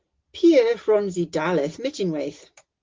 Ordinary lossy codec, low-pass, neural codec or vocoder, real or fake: Opus, 16 kbps; 7.2 kHz; none; real